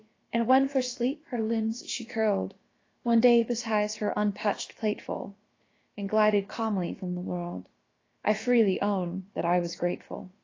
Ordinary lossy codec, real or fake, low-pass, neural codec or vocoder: AAC, 32 kbps; fake; 7.2 kHz; codec, 16 kHz, about 1 kbps, DyCAST, with the encoder's durations